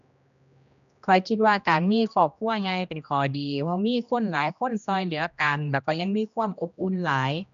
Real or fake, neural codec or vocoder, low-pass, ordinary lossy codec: fake; codec, 16 kHz, 1 kbps, X-Codec, HuBERT features, trained on general audio; 7.2 kHz; none